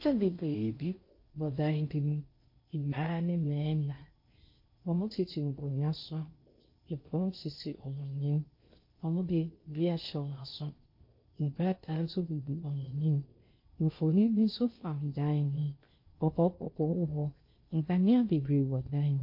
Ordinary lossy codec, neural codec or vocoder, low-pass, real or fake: MP3, 32 kbps; codec, 16 kHz in and 24 kHz out, 0.6 kbps, FocalCodec, streaming, 2048 codes; 5.4 kHz; fake